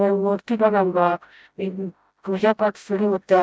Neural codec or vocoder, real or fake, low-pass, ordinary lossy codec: codec, 16 kHz, 0.5 kbps, FreqCodec, smaller model; fake; none; none